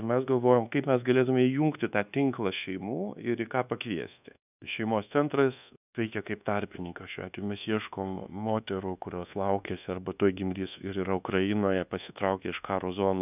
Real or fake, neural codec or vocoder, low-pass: fake; codec, 24 kHz, 1.2 kbps, DualCodec; 3.6 kHz